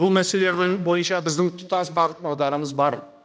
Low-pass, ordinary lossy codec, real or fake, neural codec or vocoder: none; none; fake; codec, 16 kHz, 1 kbps, X-Codec, HuBERT features, trained on balanced general audio